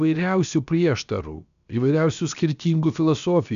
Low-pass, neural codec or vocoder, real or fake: 7.2 kHz; codec, 16 kHz, about 1 kbps, DyCAST, with the encoder's durations; fake